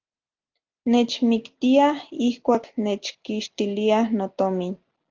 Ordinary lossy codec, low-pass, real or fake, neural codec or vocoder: Opus, 32 kbps; 7.2 kHz; real; none